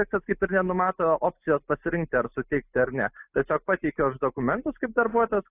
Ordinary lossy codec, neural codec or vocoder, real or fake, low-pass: AAC, 24 kbps; none; real; 3.6 kHz